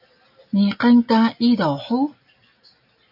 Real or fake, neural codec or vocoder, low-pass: real; none; 5.4 kHz